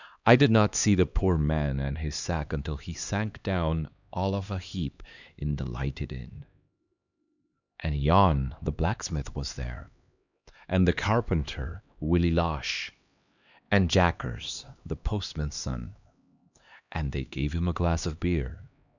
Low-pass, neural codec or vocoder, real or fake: 7.2 kHz; codec, 16 kHz, 2 kbps, X-Codec, HuBERT features, trained on LibriSpeech; fake